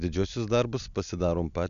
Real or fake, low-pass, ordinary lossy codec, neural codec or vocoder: real; 7.2 kHz; MP3, 96 kbps; none